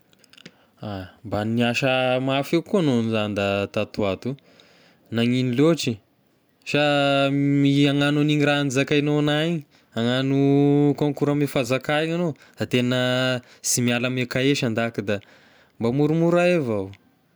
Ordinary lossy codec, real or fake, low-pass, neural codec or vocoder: none; real; none; none